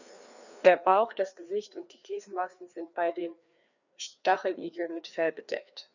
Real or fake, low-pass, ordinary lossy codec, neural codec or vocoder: fake; 7.2 kHz; none; codec, 16 kHz, 2 kbps, FreqCodec, larger model